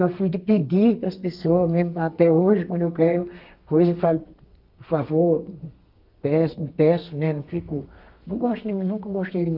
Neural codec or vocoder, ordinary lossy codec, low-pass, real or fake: codec, 32 kHz, 1.9 kbps, SNAC; Opus, 24 kbps; 5.4 kHz; fake